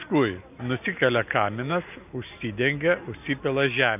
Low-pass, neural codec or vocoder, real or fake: 3.6 kHz; none; real